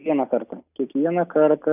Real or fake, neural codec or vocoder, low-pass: real; none; 3.6 kHz